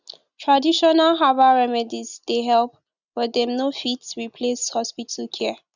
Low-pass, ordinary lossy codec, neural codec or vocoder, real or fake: 7.2 kHz; none; none; real